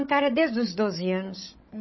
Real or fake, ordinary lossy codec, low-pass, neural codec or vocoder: fake; MP3, 24 kbps; 7.2 kHz; codec, 16 kHz, 16 kbps, FreqCodec, larger model